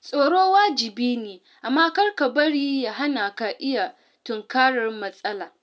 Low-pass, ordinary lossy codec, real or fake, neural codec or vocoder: none; none; real; none